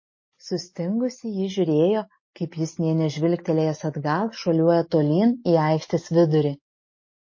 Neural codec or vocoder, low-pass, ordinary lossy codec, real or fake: none; 7.2 kHz; MP3, 32 kbps; real